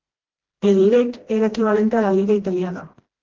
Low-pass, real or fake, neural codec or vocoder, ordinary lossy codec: 7.2 kHz; fake; codec, 16 kHz, 1 kbps, FreqCodec, smaller model; Opus, 16 kbps